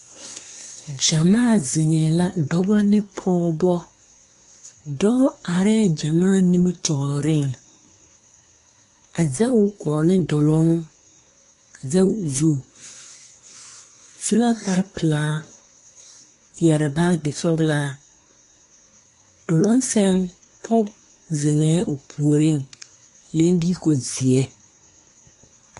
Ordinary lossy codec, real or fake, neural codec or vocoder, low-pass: AAC, 48 kbps; fake; codec, 24 kHz, 1 kbps, SNAC; 10.8 kHz